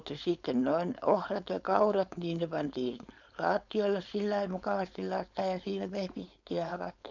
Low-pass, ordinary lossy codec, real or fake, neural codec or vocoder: 7.2 kHz; none; fake; codec, 16 kHz, 4.8 kbps, FACodec